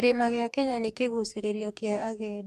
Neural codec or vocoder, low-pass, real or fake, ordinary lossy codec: codec, 44.1 kHz, 2.6 kbps, DAC; 14.4 kHz; fake; AAC, 96 kbps